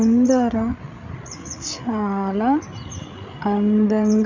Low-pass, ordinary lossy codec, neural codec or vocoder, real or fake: 7.2 kHz; none; codec, 16 kHz, 8 kbps, FreqCodec, larger model; fake